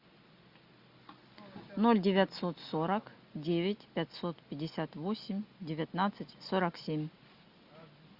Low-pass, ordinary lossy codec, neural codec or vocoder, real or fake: 5.4 kHz; Opus, 64 kbps; none; real